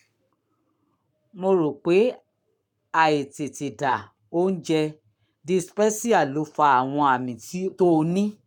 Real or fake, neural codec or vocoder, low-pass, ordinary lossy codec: fake; codec, 44.1 kHz, 7.8 kbps, Pupu-Codec; 19.8 kHz; none